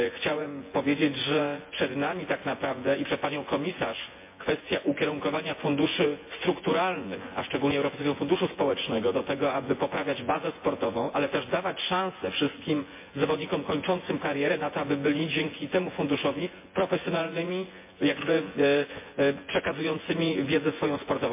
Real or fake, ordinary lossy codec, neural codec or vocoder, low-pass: fake; MP3, 24 kbps; vocoder, 24 kHz, 100 mel bands, Vocos; 3.6 kHz